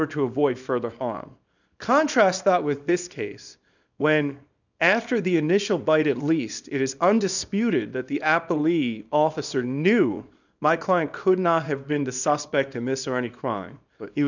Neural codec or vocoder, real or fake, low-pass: codec, 24 kHz, 0.9 kbps, WavTokenizer, small release; fake; 7.2 kHz